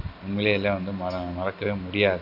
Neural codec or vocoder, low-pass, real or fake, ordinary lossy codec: none; 5.4 kHz; real; none